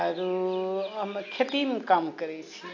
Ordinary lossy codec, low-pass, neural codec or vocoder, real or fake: none; 7.2 kHz; none; real